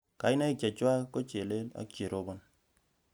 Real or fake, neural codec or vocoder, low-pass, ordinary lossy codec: real; none; none; none